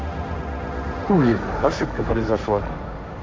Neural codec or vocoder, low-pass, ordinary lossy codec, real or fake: codec, 16 kHz, 1.1 kbps, Voila-Tokenizer; none; none; fake